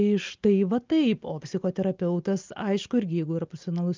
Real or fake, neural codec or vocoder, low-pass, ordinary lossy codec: real; none; 7.2 kHz; Opus, 24 kbps